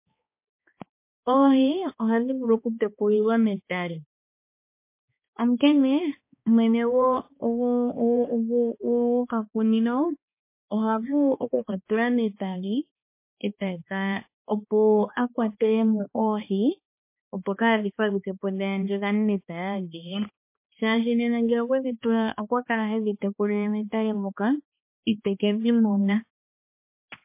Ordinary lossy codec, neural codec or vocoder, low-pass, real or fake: MP3, 24 kbps; codec, 16 kHz, 2 kbps, X-Codec, HuBERT features, trained on balanced general audio; 3.6 kHz; fake